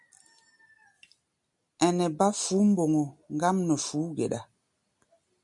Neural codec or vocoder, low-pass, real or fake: none; 10.8 kHz; real